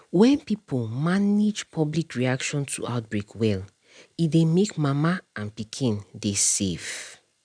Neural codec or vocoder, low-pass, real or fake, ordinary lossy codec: none; 9.9 kHz; real; none